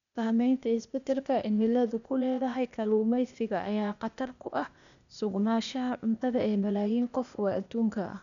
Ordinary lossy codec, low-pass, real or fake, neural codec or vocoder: none; 7.2 kHz; fake; codec, 16 kHz, 0.8 kbps, ZipCodec